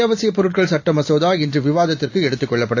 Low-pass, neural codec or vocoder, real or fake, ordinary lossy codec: 7.2 kHz; none; real; AAC, 48 kbps